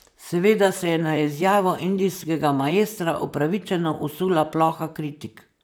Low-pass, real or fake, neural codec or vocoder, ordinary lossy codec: none; fake; vocoder, 44.1 kHz, 128 mel bands, Pupu-Vocoder; none